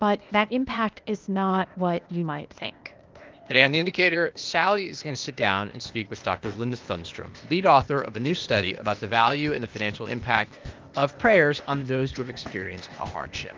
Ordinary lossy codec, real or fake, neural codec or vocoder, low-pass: Opus, 24 kbps; fake; codec, 16 kHz, 0.8 kbps, ZipCodec; 7.2 kHz